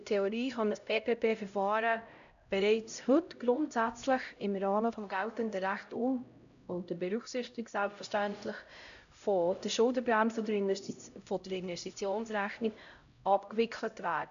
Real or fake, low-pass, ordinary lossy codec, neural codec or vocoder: fake; 7.2 kHz; none; codec, 16 kHz, 0.5 kbps, X-Codec, HuBERT features, trained on LibriSpeech